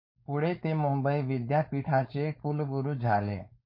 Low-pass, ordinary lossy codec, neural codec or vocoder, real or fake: 5.4 kHz; MP3, 32 kbps; codec, 16 kHz, 4.8 kbps, FACodec; fake